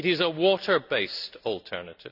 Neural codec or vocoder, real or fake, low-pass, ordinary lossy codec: none; real; 5.4 kHz; none